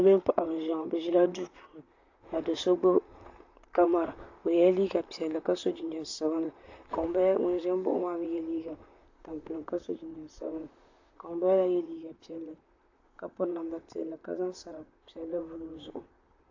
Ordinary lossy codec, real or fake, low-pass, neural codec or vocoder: AAC, 48 kbps; fake; 7.2 kHz; vocoder, 44.1 kHz, 128 mel bands, Pupu-Vocoder